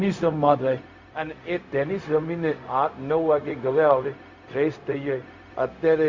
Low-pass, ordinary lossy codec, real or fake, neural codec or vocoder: 7.2 kHz; MP3, 48 kbps; fake; codec, 16 kHz, 0.4 kbps, LongCat-Audio-Codec